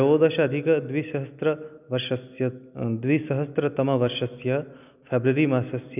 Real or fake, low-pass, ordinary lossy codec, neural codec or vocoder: real; 3.6 kHz; none; none